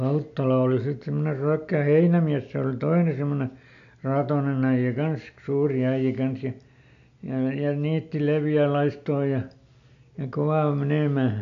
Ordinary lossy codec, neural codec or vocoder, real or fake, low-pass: AAC, 64 kbps; none; real; 7.2 kHz